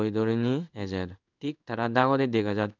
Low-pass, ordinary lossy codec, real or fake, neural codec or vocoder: 7.2 kHz; none; fake; codec, 16 kHz in and 24 kHz out, 1 kbps, XY-Tokenizer